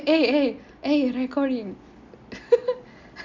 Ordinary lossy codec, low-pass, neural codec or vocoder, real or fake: MP3, 64 kbps; 7.2 kHz; none; real